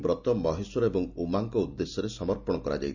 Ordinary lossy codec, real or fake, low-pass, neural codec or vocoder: none; real; 7.2 kHz; none